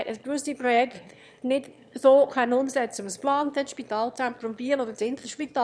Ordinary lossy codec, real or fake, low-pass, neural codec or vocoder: none; fake; none; autoencoder, 22.05 kHz, a latent of 192 numbers a frame, VITS, trained on one speaker